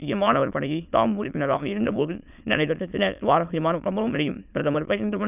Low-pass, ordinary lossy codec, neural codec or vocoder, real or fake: 3.6 kHz; none; autoencoder, 22.05 kHz, a latent of 192 numbers a frame, VITS, trained on many speakers; fake